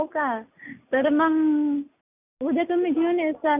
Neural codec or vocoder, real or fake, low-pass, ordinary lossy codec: none; real; 3.6 kHz; none